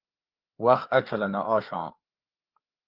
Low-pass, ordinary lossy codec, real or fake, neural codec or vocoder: 5.4 kHz; Opus, 16 kbps; fake; codec, 16 kHz, 4 kbps, FreqCodec, larger model